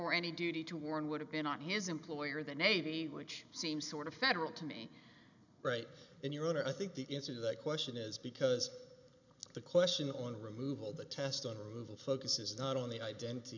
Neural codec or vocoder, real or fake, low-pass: none; real; 7.2 kHz